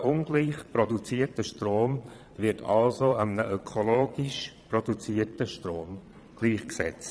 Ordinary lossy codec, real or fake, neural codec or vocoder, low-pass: none; fake; vocoder, 22.05 kHz, 80 mel bands, Vocos; none